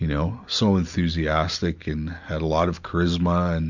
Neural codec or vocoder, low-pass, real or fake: none; 7.2 kHz; real